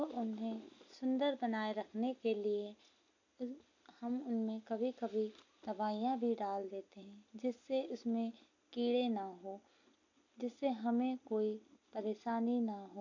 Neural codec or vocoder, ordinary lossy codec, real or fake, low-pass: none; AAC, 48 kbps; real; 7.2 kHz